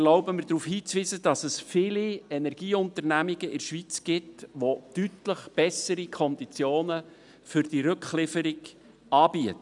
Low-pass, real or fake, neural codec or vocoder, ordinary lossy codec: 10.8 kHz; real; none; none